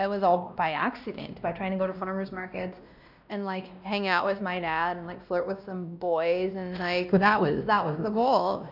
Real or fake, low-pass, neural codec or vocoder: fake; 5.4 kHz; codec, 16 kHz, 1 kbps, X-Codec, WavLM features, trained on Multilingual LibriSpeech